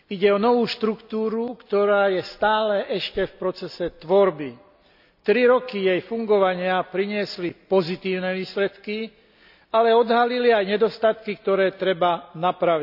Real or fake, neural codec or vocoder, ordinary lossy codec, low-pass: real; none; none; 5.4 kHz